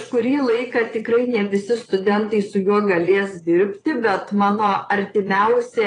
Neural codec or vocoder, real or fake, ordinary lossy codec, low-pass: vocoder, 22.05 kHz, 80 mel bands, Vocos; fake; AAC, 32 kbps; 9.9 kHz